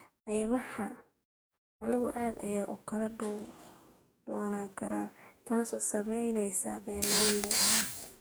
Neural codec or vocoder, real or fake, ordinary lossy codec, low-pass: codec, 44.1 kHz, 2.6 kbps, DAC; fake; none; none